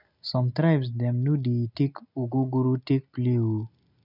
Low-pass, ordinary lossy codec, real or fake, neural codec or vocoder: 5.4 kHz; none; real; none